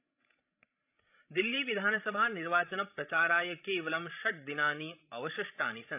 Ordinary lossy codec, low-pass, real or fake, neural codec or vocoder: AAC, 32 kbps; 3.6 kHz; fake; codec, 16 kHz, 16 kbps, FreqCodec, larger model